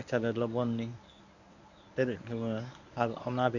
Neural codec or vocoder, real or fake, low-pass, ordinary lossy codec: codec, 24 kHz, 0.9 kbps, WavTokenizer, medium speech release version 1; fake; 7.2 kHz; none